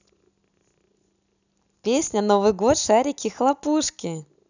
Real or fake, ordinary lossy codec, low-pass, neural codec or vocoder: real; none; 7.2 kHz; none